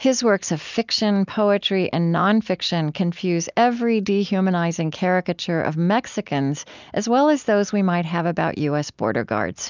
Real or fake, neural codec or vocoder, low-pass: real; none; 7.2 kHz